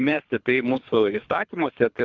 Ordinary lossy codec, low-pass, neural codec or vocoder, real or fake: Opus, 64 kbps; 7.2 kHz; codec, 24 kHz, 3 kbps, HILCodec; fake